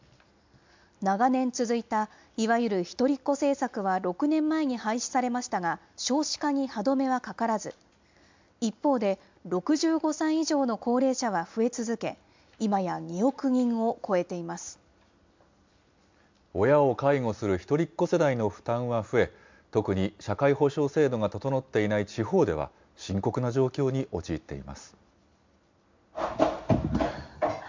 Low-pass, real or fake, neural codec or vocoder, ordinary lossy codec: 7.2 kHz; real; none; MP3, 64 kbps